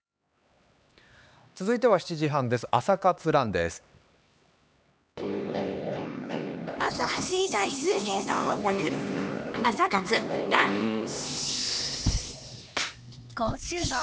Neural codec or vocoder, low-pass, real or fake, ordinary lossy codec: codec, 16 kHz, 2 kbps, X-Codec, HuBERT features, trained on LibriSpeech; none; fake; none